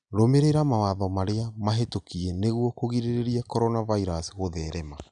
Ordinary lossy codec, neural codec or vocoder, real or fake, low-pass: none; none; real; 9.9 kHz